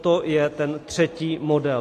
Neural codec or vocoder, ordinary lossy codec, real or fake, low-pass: none; AAC, 48 kbps; real; 14.4 kHz